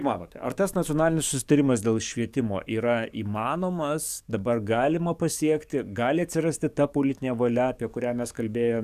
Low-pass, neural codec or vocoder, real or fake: 14.4 kHz; codec, 44.1 kHz, 7.8 kbps, DAC; fake